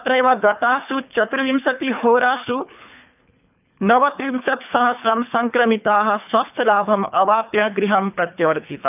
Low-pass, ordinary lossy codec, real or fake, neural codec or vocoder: 3.6 kHz; none; fake; codec, 24 kHz, 3 kbps, HILCodec